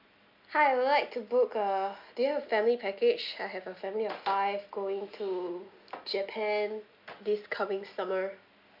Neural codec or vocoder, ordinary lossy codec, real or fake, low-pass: none; AAC, 48 kbps; real; 5.4 kHz